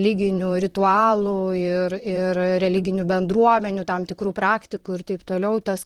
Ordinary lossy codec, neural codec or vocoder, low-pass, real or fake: Opus, 16 kbps; vocoder, 44.1 kHz, 128 mel bands, Pupu-Vocoder; 19.8 kHz; fake